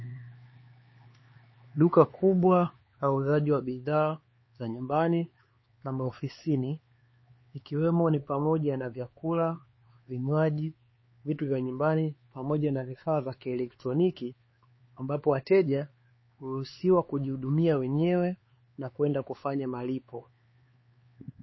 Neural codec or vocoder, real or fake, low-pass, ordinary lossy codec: codec, 16 kHz, 4 kbps, X-Codec, HuBERT features, trained on LibriSpeech; fake; 7.2 kHz; MP3, 24 kbps